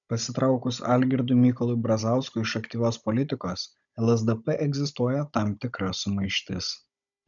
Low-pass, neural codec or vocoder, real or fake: 7.2 kHz; codec, 16 kHz, 16 kbps, FunCodec, trained on Chinese and English, 50 frames a second; fake